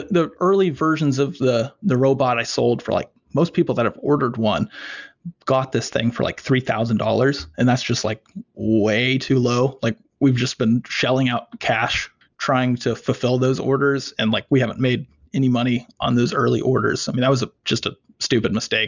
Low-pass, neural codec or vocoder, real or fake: 7.2 kHz; none; real